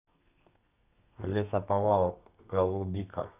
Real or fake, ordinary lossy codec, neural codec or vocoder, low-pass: fake; none; codec, 32 kHz, 1.9 kbps, SNAC; 3.6 kHz